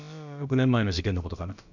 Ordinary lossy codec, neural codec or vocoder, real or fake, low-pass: none; codec, 16 kHz, about 1 kbps, DyCAST, with the encoder's durations; fake; 7.2 kHz